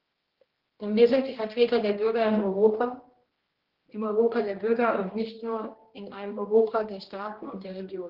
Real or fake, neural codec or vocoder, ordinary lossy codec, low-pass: fake; codec, 16 kHz, 1 kbps, X-Codec, HuBERT features, trained on general audio; Opus, 16 kbps; 5.4 kHz